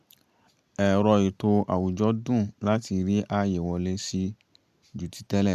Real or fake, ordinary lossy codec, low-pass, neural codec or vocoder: real; MP3, 96 kbps; 14.4 kHz; none